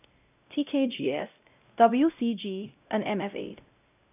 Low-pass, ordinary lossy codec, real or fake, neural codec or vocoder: 3.6 kHz; none; fake; codec, 16 kHz, 0.5 kbps, X-Codec, WavLM features, trained on Multilingual LibriSpeech